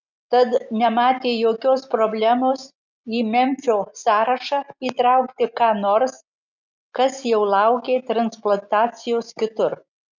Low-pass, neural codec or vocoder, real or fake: 7.2 kHz; none; real